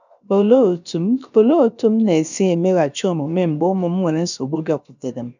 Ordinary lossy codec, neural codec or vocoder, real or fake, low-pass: none; codec, 16 kHz, about 1 kbps, DyCAST, with the encoder's durations; fake; 7.2 kHz